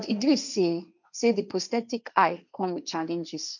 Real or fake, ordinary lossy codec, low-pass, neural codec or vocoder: fake; none; 7.2 kHz; codec, 16 kHz, 1.1 kbps, Voila-Tokenizer